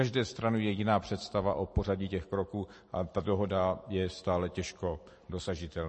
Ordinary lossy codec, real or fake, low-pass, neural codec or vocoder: MP3, 32 kbps; real; 10.8 kHz; none